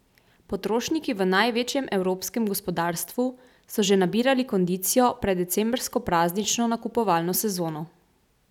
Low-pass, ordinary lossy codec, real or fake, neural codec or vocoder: 19.8 kHz; none; real; none